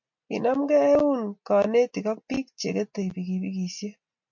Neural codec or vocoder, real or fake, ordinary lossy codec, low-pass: none; real; MP3, 48 kbps; 7.2 kHz